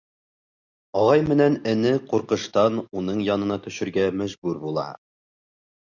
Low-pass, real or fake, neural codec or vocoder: 7.2 kHz; real; none